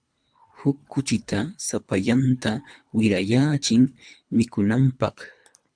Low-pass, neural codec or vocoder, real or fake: 9.9 kHz; codec, 24 kHz, 3 kbps, HILCodec; fake